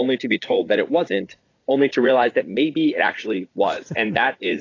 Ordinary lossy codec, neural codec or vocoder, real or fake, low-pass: AAC, 32 kbps; vocoder, 44.1 kHz, 80 mel bands, Vocos; fake; 7.2 kHz